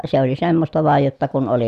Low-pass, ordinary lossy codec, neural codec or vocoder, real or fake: 14.4 kHz; none; none; real